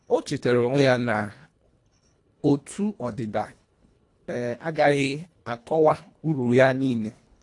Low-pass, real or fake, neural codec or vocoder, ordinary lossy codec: 10.8 kHz; fake; codec, 24 kHz, 1.5 kbps, HILCodec; AAC, 48 kbps